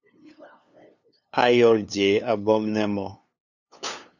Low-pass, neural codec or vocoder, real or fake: 7.2 kHz; codec, 16 kHz, 2 kbps, FunCodec, trained on LibriTTS, 25 frames a second; fake